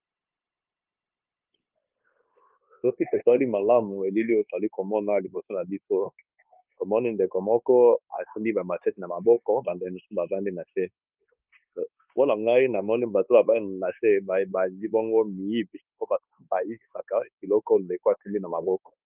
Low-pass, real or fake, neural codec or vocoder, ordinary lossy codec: 3.6 kHz; fake; codec, 16 kHz, 0.9 kbps, LongCat-Audio-Codec; Opus, 24 kbps